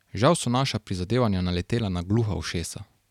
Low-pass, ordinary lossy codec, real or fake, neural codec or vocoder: 19.8 kHz; none; real; none